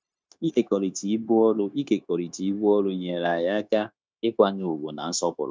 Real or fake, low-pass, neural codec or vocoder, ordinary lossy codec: fake; none; codec, 16 kHz, 0.9 kbps, LongCat-Audio-Codec; none